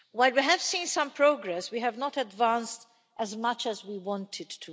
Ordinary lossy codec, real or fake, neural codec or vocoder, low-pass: none; real; none; none